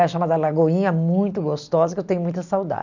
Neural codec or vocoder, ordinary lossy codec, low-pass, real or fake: none; none; 7.2 kHz; real